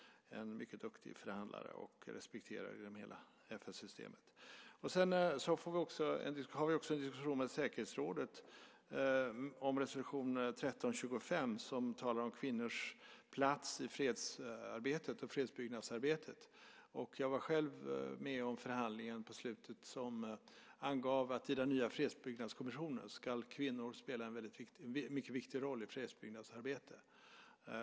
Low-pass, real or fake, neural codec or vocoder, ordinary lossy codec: none; real; none; none